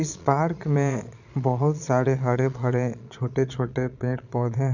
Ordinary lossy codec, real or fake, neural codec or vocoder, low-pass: none; fake; vocoder, 22.05 kHz, 80 mel bands, Vocos; 7.2 kHz